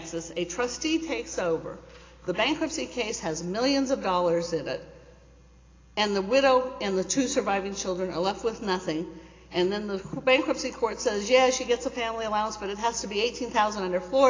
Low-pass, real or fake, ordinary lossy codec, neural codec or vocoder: 7.2 kHz; real; AAC, 32 kbps; none